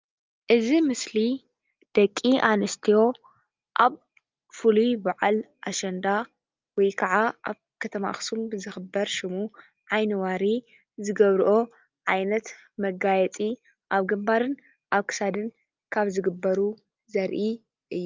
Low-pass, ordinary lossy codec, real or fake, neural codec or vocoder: 7.2 kHz; Opus, 24 kbps; real; none